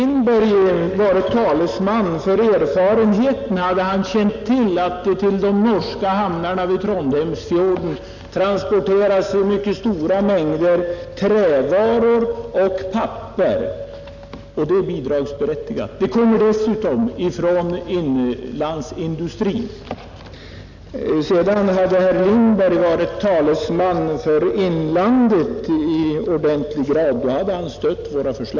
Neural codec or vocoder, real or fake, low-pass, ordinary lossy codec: none; real; 7.2 kHz; none